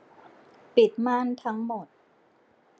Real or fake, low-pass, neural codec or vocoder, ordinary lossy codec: real; none; none; none